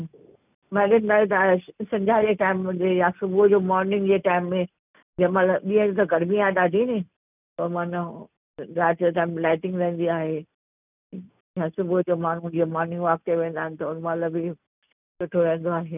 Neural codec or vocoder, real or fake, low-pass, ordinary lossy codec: none; real; 3.6 kHz; none